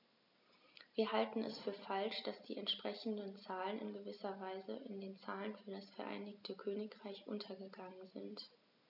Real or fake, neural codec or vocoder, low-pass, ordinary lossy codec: real; none; 5.4 kHz; none